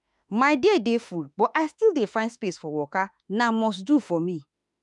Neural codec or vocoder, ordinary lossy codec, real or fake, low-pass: autoencoder, 48 kHz, 32 numbers a frame, DAC-VAE, trained on Japanese speech; none; fake; 10.8 kHz